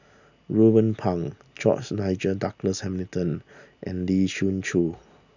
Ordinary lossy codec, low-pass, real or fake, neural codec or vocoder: none; 7.2 kHz; real; none